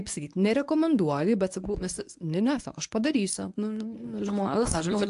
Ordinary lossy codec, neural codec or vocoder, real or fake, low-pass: AAC, 64 kbps; codec, 24 kHz, 0.9 kbps, WavTokenizer, medium speech release version 1; fake; 10.8 kHz